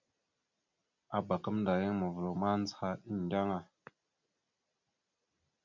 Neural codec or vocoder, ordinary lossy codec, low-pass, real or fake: none; MP3, 64 kbps; 7.2 kHz; real